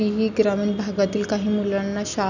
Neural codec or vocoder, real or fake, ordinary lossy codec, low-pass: none; real; none; 7.2 kHz